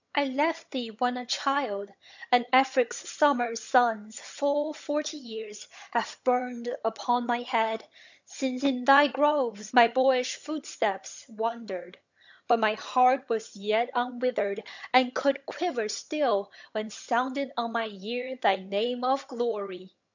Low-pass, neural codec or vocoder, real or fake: 7.2 kHz; vocoder, 22.05 kHz, 80 mel bands, HiFi-GAN; fake